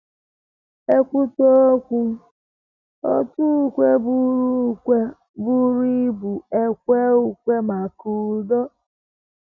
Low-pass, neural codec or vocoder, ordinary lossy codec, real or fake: 7.2 kHz; none; none; real